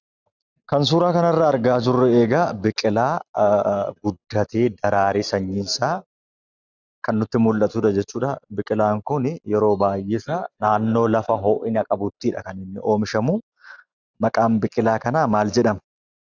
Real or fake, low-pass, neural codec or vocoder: real; 7.2 kHz; none